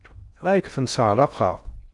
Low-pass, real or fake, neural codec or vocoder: 10.8 kHz; fake; codec, 16 kHz in and 24 kHz out, 0.6 kbps, FocalCodec, streaming, 4096 codes